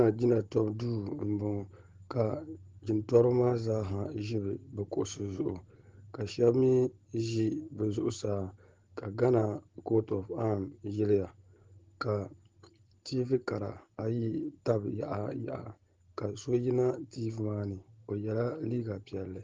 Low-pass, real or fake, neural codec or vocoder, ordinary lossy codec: 7.2 kHz; real; none; Opus, 16 kbps